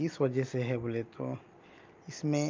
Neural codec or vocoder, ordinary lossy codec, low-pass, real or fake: none; Opus, 24 kbps; 7.2 kHz; real